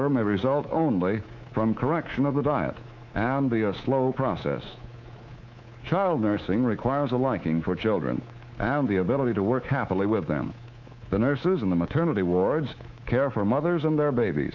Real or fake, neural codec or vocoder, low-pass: fake; codec, 24 kHz, 3.1 kbps, DualCodec; 7.2 kHz